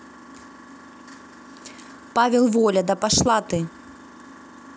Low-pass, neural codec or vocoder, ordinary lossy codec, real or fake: none; none; none; real